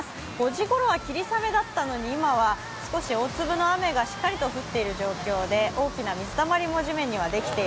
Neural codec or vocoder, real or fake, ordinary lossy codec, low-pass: none; real; none; none